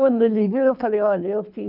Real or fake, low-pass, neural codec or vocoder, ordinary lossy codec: fake; 5.4 kHz; codec, 24 kHz, 3 kbps, HILCodec; none